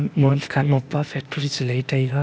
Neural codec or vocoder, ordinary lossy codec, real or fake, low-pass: codec, 16 kHz, 0.8 kbps, ZipCodec; none; fake; none